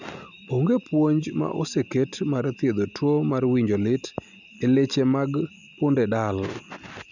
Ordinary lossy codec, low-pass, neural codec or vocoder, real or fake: none; 7.2 kHz; none; real